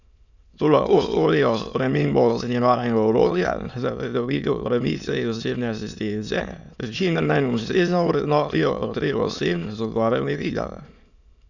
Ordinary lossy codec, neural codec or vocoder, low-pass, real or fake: none; autoencoder, 22.05 kHz, a latent of 192 numbers a frame, VITS, trained on many speakers; 7.2 kHz; fake